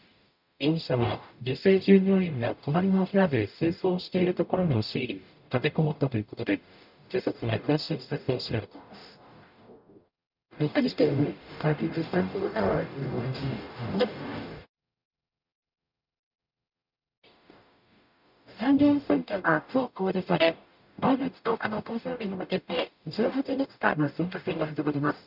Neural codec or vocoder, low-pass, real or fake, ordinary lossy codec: codec, 44.1 kHz, 0.9 kbps, DAC; 5.4 kHz; fake; none